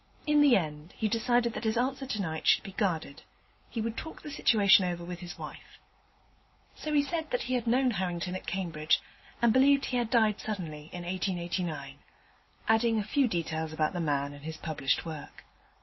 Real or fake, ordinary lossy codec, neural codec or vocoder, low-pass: real; MP3, 24 kbps; none; 7.2 kHz